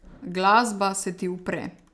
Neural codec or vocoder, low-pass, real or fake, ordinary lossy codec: none; none; real; none